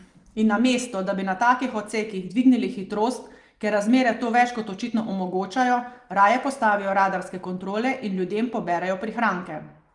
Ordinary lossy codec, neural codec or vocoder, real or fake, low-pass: Opus, 32 kbps; none; real; 10.8 kHz